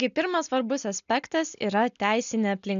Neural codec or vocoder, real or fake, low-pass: none; real; 7.2 kHz